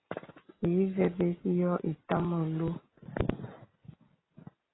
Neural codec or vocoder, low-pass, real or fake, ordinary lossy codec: none; 7.2 kHz; real; AAC, 16 kbps